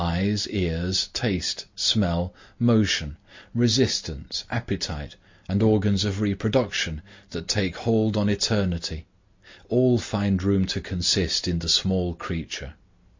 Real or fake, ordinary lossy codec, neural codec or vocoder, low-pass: real; MP3, 64 kbps; none; 7.2 kHz